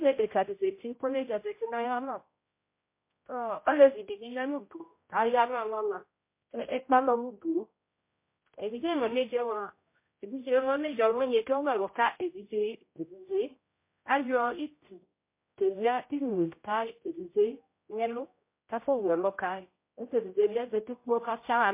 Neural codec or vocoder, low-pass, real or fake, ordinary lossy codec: codec, 16 kHz, 0.5 kbps, X-Codec, HuBERT features, trained on general audio; 3.6 kHz; fake; MP3, 24 kbps